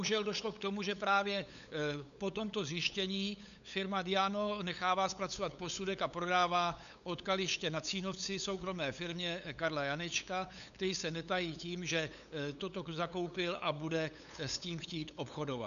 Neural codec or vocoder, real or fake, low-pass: codec, 16 kHz, 8 kbps, FunCodec, trained on LibriTTS, 25 frames a second; fake; 7.2 kHz